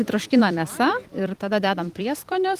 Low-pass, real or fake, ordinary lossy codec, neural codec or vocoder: 14.4 kHz; fake; Opus, 24 kbps; vocoder, 44.1 kHz, 128 mel bands every 256 samples, BigVGAN v2